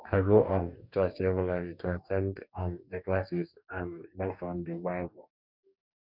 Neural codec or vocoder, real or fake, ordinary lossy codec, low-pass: codec, 44.1 kHz, 2.6 kbps, DAC; fake; none; 5.4 kHz